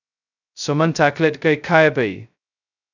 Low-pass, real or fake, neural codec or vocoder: 7.2 kHz; fake; codec, 16 kHz, 0.2 kbps, FocalCodec